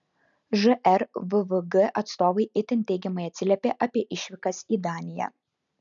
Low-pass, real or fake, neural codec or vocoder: 7.2 kHz; real; none